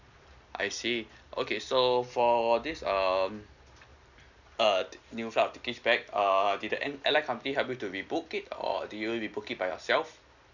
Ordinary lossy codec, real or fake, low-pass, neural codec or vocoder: none; real; 7.2 kHz; none